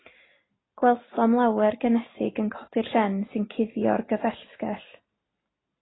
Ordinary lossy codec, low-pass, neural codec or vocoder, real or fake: AAC, 16 kbps; 7.2 kHz; none; real